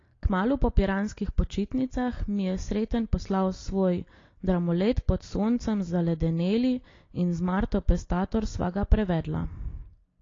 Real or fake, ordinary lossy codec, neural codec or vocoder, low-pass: real; AAC, 32 kbps; none; 7.2 kHz